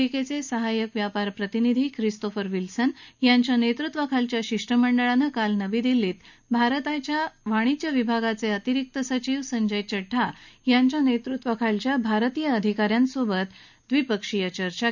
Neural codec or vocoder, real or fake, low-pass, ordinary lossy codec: none; real; 7.2 kHz; none